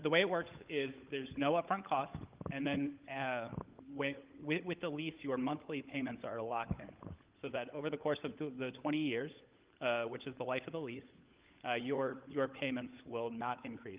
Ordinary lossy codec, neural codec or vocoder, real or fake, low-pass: Opus, 32 kbps; codec, 16 kHz, 16 kbps, FunCodec, trained on LibriTTS, 50 frames a second; fake; 3.6 kHz